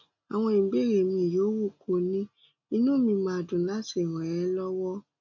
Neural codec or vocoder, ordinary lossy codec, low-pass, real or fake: none; none; 7.2 kHz; real